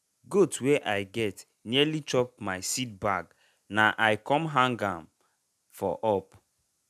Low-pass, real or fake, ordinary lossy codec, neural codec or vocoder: 14.4 kHz; real; none; none